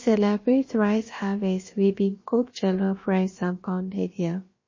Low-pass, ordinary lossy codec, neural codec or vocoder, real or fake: 7.2 kHz; MP3, 32 kbps; codec, 16 kHz, about 1 kbps, DyCAST, with the encoder's durations; fake